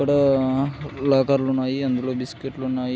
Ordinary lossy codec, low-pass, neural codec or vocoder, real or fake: none; none; none; real